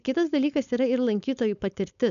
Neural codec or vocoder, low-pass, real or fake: codec, 16 kHz, 4.8 kbps, FACodec; 7.2 kHz; fake